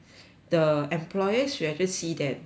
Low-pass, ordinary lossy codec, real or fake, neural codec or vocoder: none; none; real; none